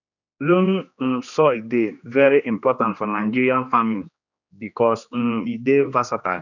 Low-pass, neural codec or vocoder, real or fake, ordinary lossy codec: 7.2 kHz; codec, 16 kHz, 2 kbps, X-Codec, HuBERT features, trained on general audio; fake; none